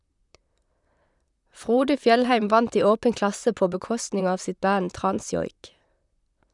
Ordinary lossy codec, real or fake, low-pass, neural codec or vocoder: none; fake; 10.8 kHz; vocoder, 44.1 kHz, 128 mel bands, Pupu-Vocoder